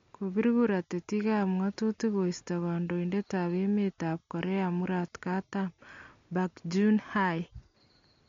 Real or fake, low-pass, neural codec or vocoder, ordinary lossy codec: real; 7.2 kHz; none; MP3, 48 kbps